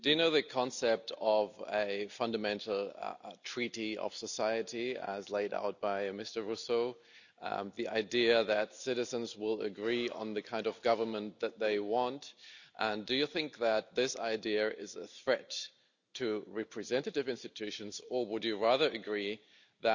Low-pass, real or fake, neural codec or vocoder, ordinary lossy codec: 7.2 kHz; real; none; none